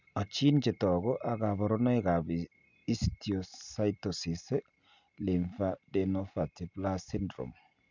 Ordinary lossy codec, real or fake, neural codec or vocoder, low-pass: none; real; none; 7.2 kHz